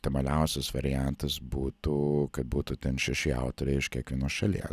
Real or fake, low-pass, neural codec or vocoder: real; 14.4 kHz; none